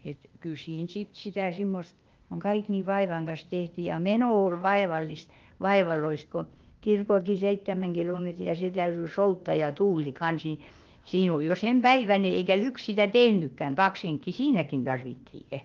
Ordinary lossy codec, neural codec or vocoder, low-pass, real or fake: Opus, 32 kbps; codec, 16 kHz, 0.8 kbps, ZipCodec; 7.2 kHz; fake